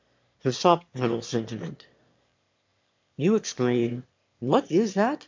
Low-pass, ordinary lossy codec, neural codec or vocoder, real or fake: 7.2 kHz; MP3, 48 kbps; autoencoder, 22.05 kHz, a latent of 192 numbers a frame, VITS, trained on one speaker; fake